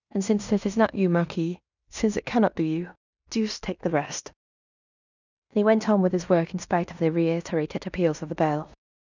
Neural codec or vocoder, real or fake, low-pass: codec, 16 kHz in and 24 kHz out, 0.9 kbps, LongCat-Audio-Codec, fine tuned four codebook decoder; fake; 7.2 kHz